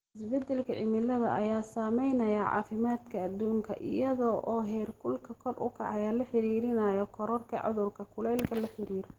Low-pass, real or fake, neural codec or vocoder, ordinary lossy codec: 19.8 kHz; real; none; Opus, 16 kbps